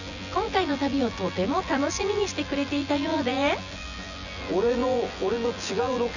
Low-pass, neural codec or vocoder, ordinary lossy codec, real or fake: 7.2 kHz; vocoder, 24 kHz, 100 mel bands, Vocos; none; fake